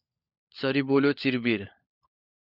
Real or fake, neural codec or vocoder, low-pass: fake; codec, 16 kHz, 16 kbps, FunCodec, trained on LibriTTS, 50 frames a second; 5.4 kHz